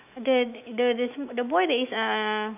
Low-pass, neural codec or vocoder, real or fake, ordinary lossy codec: 3.6 kHz; autoencoder, 48 kHz, 128 numbers a frame, DAC-VAE, trained on Japanese speech; fake; none